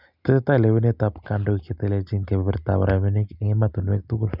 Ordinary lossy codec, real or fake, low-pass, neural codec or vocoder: none; real; 5.4 kHz; none